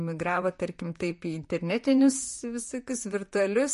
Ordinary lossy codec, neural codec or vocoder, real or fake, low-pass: MP3, 48 kbps; vocoder, 44.1 kHz, 128 mel bands, Pupu-Vocoder; fake; 14.4 kHz